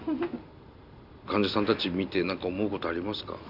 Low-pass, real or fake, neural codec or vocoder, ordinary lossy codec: 5.4 kHz; real; none; none